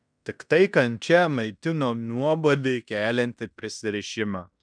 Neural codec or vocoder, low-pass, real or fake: codec, 16 kHz in and 24 kHz out, 0.9 kbps, LongCat-Audio-Codec, fine tuned four codebook decoder; 9.9 kHz; fake